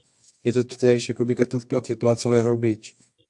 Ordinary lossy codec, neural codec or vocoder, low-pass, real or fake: AAC, 64 kbps; codec, 24 kHz, 0.9 kbps, WavTokenizer, medium music audio release; 10.8 kHz; fake